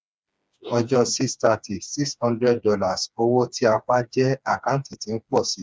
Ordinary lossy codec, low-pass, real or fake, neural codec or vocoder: none; none; fake; codec, 16 kHz, 4 kbps, FreqCodec, smaller model